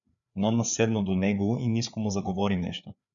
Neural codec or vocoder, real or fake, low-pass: codec, 16 kHz, 4 kbps, FreqCodec, larger model; fake; 7.2 kHz